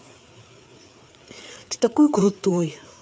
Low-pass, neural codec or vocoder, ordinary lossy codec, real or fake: none; codec, 16 kHz, 4 kbps, FreqCodec, larger model; none; fake